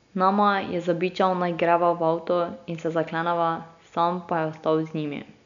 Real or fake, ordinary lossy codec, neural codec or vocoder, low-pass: real; none; none; 7.2 kHz